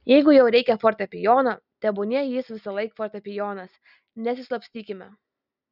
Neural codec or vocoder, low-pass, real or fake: none; 5.4 kHz; real